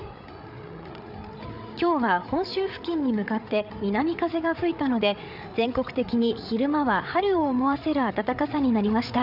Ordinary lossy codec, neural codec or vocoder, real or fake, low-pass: none; codec, 16 kHz, 8 kbps, FreqCodec, larger model; fake; 5.4 kHz